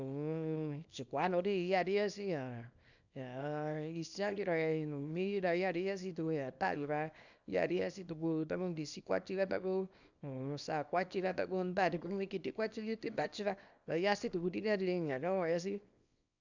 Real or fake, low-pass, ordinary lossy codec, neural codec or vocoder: fake; 7.2 kHz; none; codec, 24 kHz, 0.9 kbps, WavTokenizer, small release